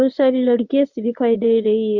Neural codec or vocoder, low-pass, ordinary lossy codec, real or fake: codec, 24 kHz, 0.9 kbps, WavTokenizer, medium speech release version 1; 7.2 kHz; none; fake